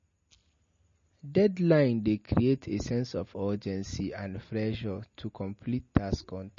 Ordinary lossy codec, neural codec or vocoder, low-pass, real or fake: MP3, 32 kbps; none; 7.2 kHz; real